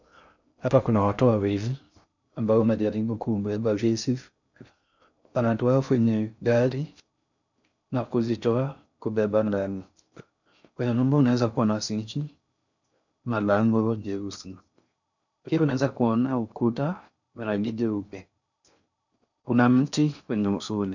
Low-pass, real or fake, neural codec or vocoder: 7.2 kHz; fake; codec, 16 kHz in and 24 kHz out, 0.6 kbps, FocalCodec, streaming, 2048 codes